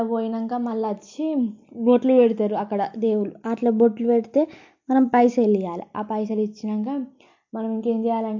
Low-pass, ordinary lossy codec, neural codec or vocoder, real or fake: 7.2 kHz; MP3, 48 kbps; none; real